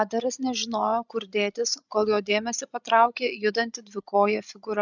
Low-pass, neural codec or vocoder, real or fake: 7.2 kHz; codec, 16 kHz, 16 kbps, FreqCodec, larger model; fake